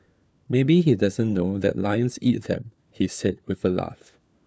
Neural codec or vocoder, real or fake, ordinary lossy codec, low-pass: codec, 16 kHz, 4 kbps, FunCodec, trained on LibriTTS, 50 frames a second; fake; none; none